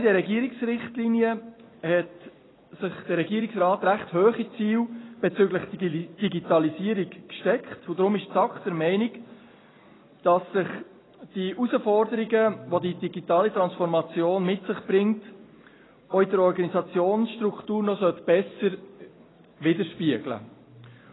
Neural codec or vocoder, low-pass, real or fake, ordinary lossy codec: none; 7.2 kHz; real; AAC, 16 kbps